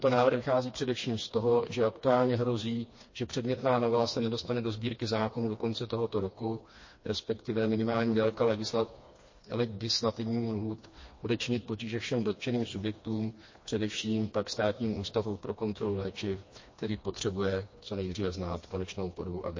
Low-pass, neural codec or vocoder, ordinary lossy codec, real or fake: 7.2 kHz; codec, 16 kHz, 2 kbps, FreqCodec, smaller model; MP3, 32 kbps; fake